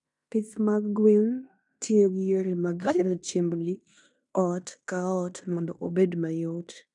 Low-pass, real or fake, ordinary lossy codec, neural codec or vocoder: 10.8 kHz; fake; none; codec, 16 kHz in and 24 kHz out, 0.9 kbps, LongCat-Audio-Codec, fine tuned four codebook decoder